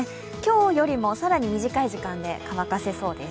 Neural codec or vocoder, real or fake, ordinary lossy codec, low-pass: none; real; none; none